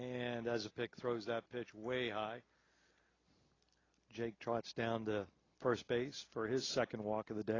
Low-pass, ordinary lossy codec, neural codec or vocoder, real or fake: 7.2 kHz; AAC, 32 kbps; none; real